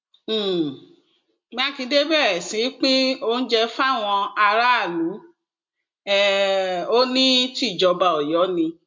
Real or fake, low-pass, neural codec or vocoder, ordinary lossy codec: real; 7.2 kHz; none; MP3, 64 kbps